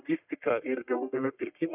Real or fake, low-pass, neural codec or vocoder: fake; 3.6 kHz; codec, 44.1 kHz, 1.7 kbps, Pupu-Codec